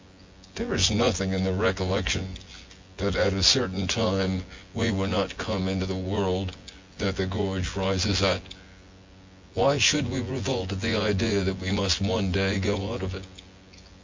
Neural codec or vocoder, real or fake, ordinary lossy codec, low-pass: vocoder, 24 kHz, 100 mel bands, Vocos; fake; MP3, 48 kbps; 7.2 kHz